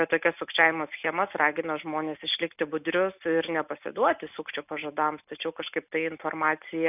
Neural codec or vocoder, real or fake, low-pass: none; real; 3.6 kHz